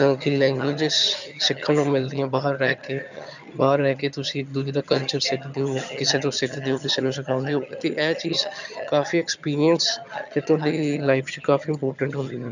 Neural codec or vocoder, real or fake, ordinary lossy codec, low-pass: vocoder, 22.05 kHz, 80 mel bands, HiFi-GAN; fake; none; 7.2 kHz